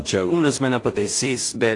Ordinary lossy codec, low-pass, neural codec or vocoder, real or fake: AAC, 48 kbps; 10.8 kHz; codec, 16 kHz in and 24 kHz out, 0.4 kbps, LongCat-Audio-Codec, two codebook decoder; fake